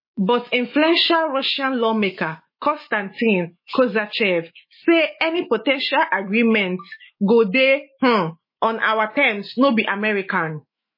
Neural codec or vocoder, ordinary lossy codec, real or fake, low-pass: autoencoder, 48 kHz, 128 numbers a frame, DAC-VAE, trained on Japanese speech; MP3, 24 kbps; fake; 5.4 kHz